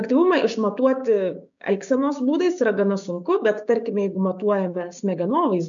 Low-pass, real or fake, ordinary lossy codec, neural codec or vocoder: 7.2 kHz; fake; MP3, 96 kbps; codec, 16 kHz, 6 kbps, DAC